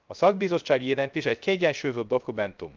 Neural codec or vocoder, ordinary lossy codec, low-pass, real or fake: codec, 16 kHz, 0.3 kbps, FocalCodec; Opus, 24 kbps; 7.2 kHz; fake